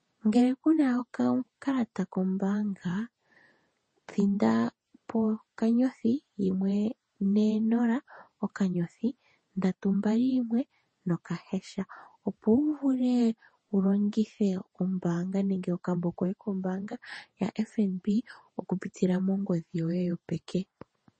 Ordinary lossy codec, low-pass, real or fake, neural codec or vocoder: MP3, 32 kbps; 10.8 kHz; fake; vocoder, 48 kHz, 128 mel bands, Vocos